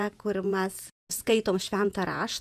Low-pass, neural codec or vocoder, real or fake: 14.4 kHz; vocoder, 48 kHz, 128 mel bands, Vocos; fake